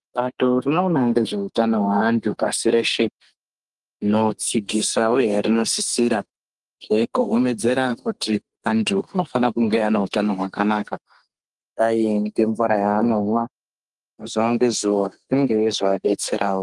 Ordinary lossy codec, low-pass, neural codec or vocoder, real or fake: Opus, 24 kbps; 10.8 kHz; codec, 32 kHz, 1.9 kbps, SNAC; fake